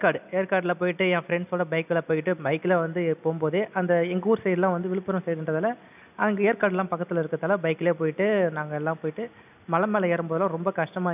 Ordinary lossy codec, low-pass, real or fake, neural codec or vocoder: none; 3.6 kHz; real; none